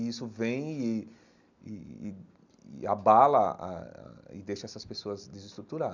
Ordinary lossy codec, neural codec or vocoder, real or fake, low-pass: none; none; real; 7.2 kHz